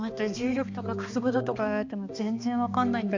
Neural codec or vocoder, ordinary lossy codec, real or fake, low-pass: codec, 16 kHz, 2 kbps, X-Codec, HuBERT features, trained on balanced general audio; Opus, 64 kbps; fake; 7.2 kHz